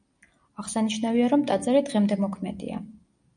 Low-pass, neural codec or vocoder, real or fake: 9.9 kHz; none; real